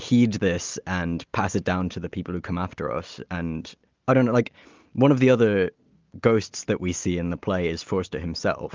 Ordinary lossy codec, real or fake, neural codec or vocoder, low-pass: Opus, 24 kbps; real; none; 7.2 kHz